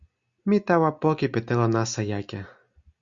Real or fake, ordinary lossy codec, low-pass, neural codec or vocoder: real; Opus, 64 kbps; 7.2 kHz; none